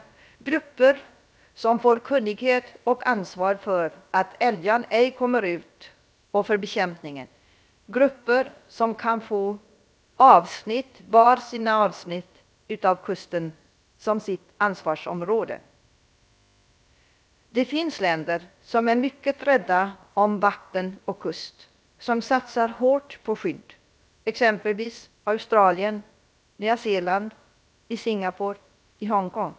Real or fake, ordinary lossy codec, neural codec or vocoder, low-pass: fake; none; codec, 16 kHz, about 1 kbps, DyCAST, with the encoder's durations; none